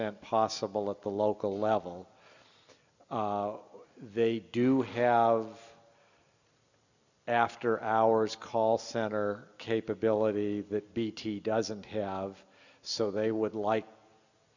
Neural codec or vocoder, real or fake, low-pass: none; real; 7.2 kHz